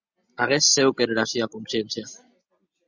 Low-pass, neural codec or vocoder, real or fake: 7.2 kHz; none; real